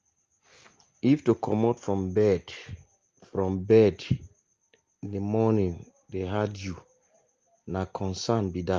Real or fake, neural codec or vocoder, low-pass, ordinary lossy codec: real; none; 7.2 kHz; Opus, 32 kbps